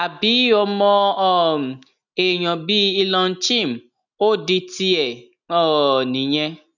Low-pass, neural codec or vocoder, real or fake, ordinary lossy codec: 7.2 kHz; none; real; none